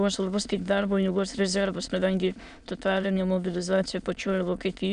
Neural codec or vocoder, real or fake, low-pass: autoencoder, 22.05 kHz, a latent of 192 numbers a frame, VITS, trained on many speakers; fake; 9.9 kHz